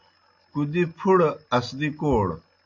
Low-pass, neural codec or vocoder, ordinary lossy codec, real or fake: 7.2 kHz; none; AAC, 48 kbps; real